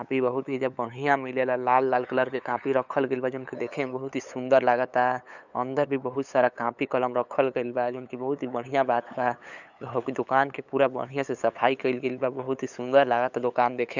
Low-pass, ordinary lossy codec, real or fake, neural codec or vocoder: 7.2 kHz; none; fake; codec, 16 kHz, 8 kbps, FunCodec, trained on LibriTTS, 25 frames a second